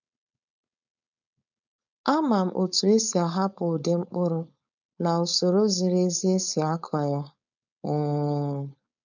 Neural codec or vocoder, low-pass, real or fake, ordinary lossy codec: codec, 16 kHz, 4.8 kbps, FACodec; 7.2 kHz; fake; none